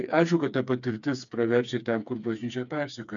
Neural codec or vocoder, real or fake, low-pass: codec, 16 kHz, 4 kbps, FreqCodec, smaller model; fake; 7.2 kHz